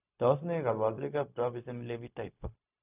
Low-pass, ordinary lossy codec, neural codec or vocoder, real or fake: 3.6 kHz; none; codec, 16 kHz, 0.4 kbps, LongCat-Audio-Codec; fake